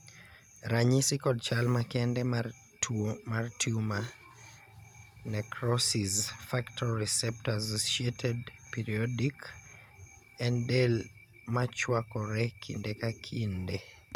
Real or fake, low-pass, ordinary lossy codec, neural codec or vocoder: real; 19.8 kHz; none; none